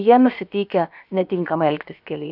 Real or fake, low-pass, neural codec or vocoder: fake; 5.4 kHz; codec, 16 kHz, about 1 kbps, DyCAST, with the encoder's durations